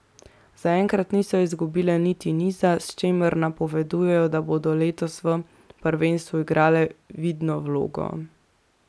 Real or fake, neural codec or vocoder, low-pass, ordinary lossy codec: real; none; none; none